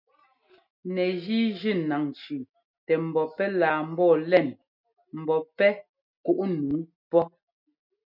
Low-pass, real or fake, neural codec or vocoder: 5.4 kHz; real; none